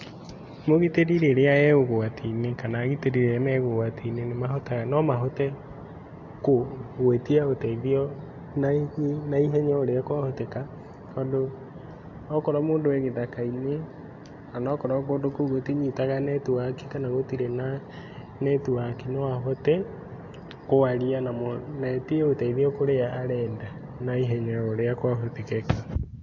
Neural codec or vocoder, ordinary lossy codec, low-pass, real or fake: none; AAC, 48 kbps; 7.2 kHz; real